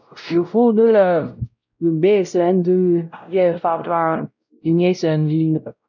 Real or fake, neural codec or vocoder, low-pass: fake; codec, 16 kHz, 0.5 kbps, X-Codec, WavLM features, trained on Multilingual LibriSpeech; 7.2 kHz